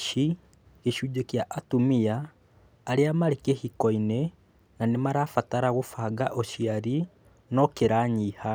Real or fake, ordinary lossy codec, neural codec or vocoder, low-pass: real; none; none; none